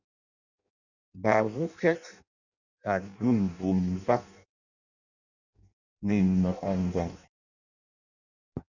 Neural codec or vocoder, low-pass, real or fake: codec, 16 kHz in and 24 kHz out, 0.6 kbps, FireRedTTS-2 codec; 7.2 kHz; fake